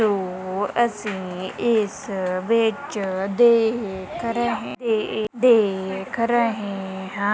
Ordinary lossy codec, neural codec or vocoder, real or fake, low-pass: none; none; real; none